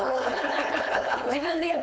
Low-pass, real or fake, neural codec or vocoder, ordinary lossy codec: none; fake; codec, 16 kHz, 4.8 kbps, FACodec; none